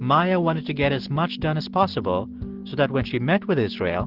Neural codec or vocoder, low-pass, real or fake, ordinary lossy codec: none; 5.4 kHz; real; Opus, 16 kbps